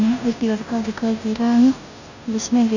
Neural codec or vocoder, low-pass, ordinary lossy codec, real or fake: codec, 16 kHz, 0.5 kbps, FunCodec, trained on Chinese and English, 25 frames a second; 7.2 kHz; none; fake